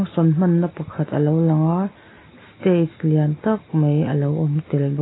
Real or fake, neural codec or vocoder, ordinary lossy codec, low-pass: real; none; AAC, 16 kbps; 7.2 kHz